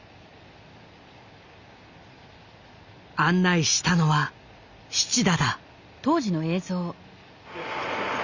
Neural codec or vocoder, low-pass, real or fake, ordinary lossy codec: none; 7.2 kHz; real; Opus, 64 kbps